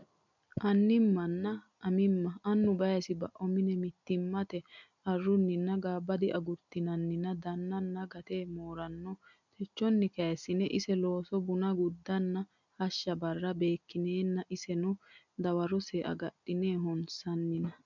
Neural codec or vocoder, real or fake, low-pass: none; real; 7.2 kHz